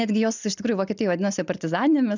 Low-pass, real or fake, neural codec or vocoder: 7.2 kHz; real; none